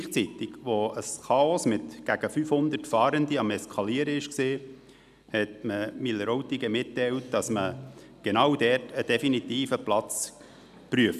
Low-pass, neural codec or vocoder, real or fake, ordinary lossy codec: 14.4 kHz; none; real; none